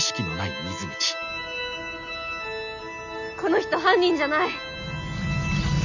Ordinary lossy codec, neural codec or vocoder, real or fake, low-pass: none; none; real; 7.2 kHz